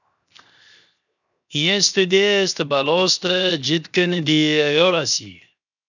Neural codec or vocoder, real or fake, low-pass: codec, 16 kHz, 0.7 kbps, FocalCodec; fake; 7.2 kHz